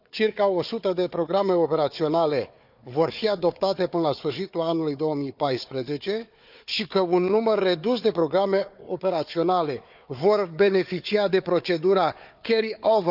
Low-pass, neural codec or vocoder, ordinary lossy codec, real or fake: 5.4 kHz; codec, 16 kHz, 4 kbps, FunCodec, trained on Chinese and English, 50 frames a second; none; fake